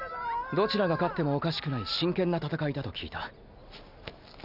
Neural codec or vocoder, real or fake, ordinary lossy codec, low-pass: none; real; none; 5.4 kHz